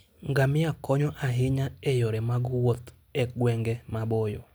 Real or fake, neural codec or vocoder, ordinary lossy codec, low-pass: real; none; none; none